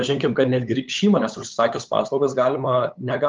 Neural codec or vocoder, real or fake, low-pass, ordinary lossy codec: codec, 16 kHz, 8 kbps, FunCodec, trained on LibriTTS, 25 frames a second; fake; 7.2 kHz; Opus, 32 kbps